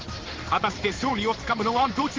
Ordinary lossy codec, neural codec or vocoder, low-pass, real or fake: Opus, 16 kbps; codec, 16 kHz in and 24 kHz out, 1 kbps, XY-Tokenizer; 7.2 kHz; fake